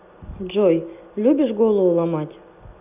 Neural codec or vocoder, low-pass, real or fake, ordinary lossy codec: none; 3.6 kHz; real; AAC, 32 kbps